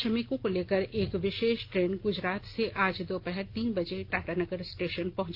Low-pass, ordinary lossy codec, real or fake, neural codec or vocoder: 5.4 kHz; Opus, 24 kbps; real; none